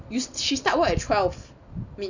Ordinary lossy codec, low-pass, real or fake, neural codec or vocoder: none; 7.2 kHz; real; none